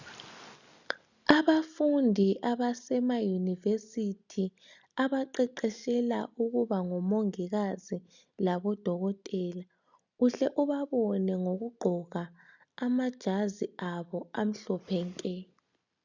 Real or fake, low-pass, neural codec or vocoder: real; 7.2 kHz; none